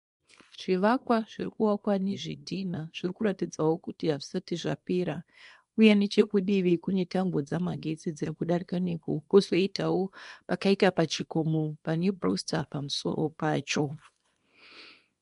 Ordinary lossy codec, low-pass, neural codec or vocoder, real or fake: MP3, 64 kbps; 10.8 kHz; codec, 24 kHz, 0.9 kbps, WavTokenizer, small release; fake